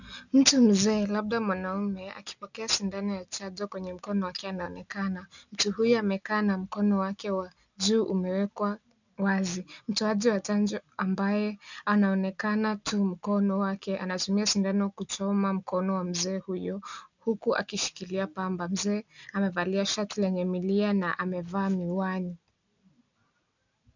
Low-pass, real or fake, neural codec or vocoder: 7.2 kHz; real; none